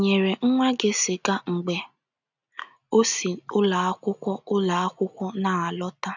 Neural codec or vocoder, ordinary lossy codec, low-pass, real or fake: none; none; 7.2 kHz; real